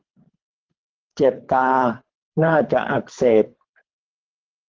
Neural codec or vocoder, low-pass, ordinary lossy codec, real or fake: codec, 24 kHz, 3 kbps, HILCodec; 7.2 kHz; Opus, 16 kbps; fake